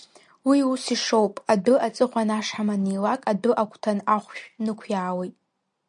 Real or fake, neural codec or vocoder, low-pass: real; none; 9.9 kHz